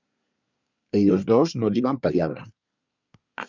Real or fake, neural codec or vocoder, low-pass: fake; codec, 24 kHz, 1 kbps, SNAC; 7.2 kHz